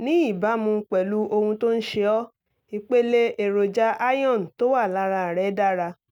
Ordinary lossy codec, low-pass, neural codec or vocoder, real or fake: none; 19.8 kHz; none; real